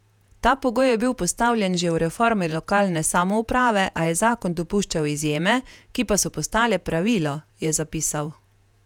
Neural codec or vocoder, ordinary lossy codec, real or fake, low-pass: vocoder, 48 kHz, 128 mel bands, Vocos; none; fake; 19.8 kHz